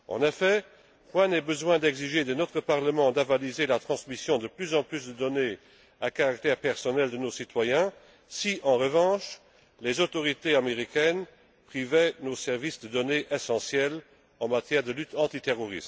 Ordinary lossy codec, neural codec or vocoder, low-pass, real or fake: none; none; none; real